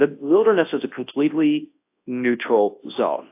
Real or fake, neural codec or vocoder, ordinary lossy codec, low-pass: fake; codec, 24 kHz, 0.9 kbps, WavTokenizer, large speech release; AAC, 24 kbps; 3.6 kHz